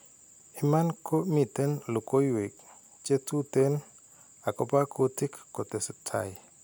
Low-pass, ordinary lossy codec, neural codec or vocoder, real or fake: none; none; none; real